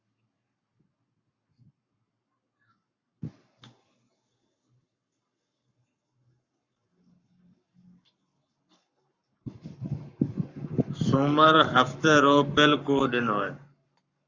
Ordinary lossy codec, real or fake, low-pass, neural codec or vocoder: Opus, 64 kbps; fake; 7.2 kHz; codec, 44.1 kHz, 7.8 kbps, Pupu-Codec